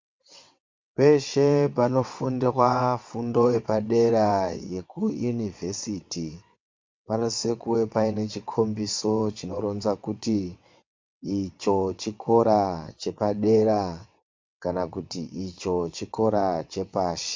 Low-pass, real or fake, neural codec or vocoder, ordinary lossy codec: 7.2 kHz; fake; vocoder, 22.05 kHz, 80 mel bands, WaveNeXt; MP3, 64 kbps